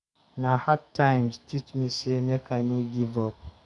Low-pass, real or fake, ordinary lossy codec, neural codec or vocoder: 10.8 kHz; fake; none; codec, 44.1 kHz, 2.6 kbps, SNAC